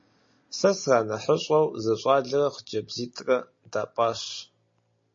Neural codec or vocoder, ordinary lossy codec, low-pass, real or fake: none; MP3, 32 kbps; 7.2 kHz; real